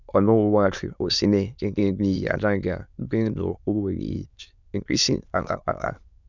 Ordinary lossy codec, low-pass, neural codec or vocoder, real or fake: none; 7.2 kHz; autoencoder, 22.05 kHz, a latent of 192 numbers a frame, VITS, trained on many speakers; fake